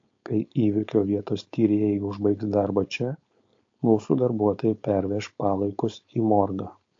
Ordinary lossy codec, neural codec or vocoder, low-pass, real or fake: AAC, 48 kbps; codec, 16 kHz, 4.8 kbps, FACodec; 7.2 kHz; fake